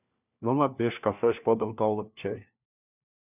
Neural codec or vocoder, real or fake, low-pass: codec, 16 kHz, 1 kbps, FunCodec, trained on LibriTTS, 50 frames a second; fake; 3.6 kHz